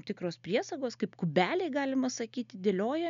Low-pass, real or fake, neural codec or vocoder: 7.2 kHz; real; none